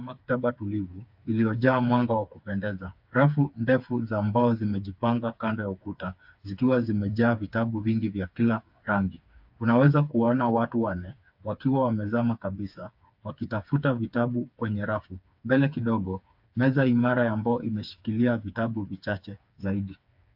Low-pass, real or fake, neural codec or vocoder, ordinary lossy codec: 5.4 kHz; fake; codec, 16 kHz, 4 kbps, FreqCodec, smaller model; AAC, 48 kbps